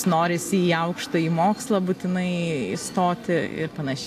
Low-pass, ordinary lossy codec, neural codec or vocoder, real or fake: 14.4 kHz; AAC, 64 kbps; none; real